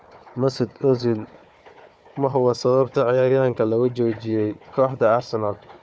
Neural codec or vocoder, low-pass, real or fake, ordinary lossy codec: codec, 16 kHz, 4 kbps, FunCodec, trained on Chinese and English, 50 frames a second; none; fake; none